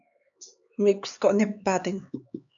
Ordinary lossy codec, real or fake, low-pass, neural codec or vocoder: MP3, 96 kbps; fake; 7.2 kHz; codec, 16 kHz, 4 kbps, X-Codec, HuBERT features, trained on LibriSpeech